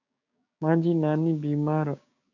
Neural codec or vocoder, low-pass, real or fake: codec, 16 kHz, 6 kbps, DAC; 7.2 kHz; fake